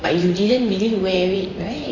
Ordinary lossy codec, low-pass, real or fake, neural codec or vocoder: AAC, 48 kbps; 7.2 kHz; fake; vocoder, 44.1 kHz, 128 mel bands, Pupu-Vocoder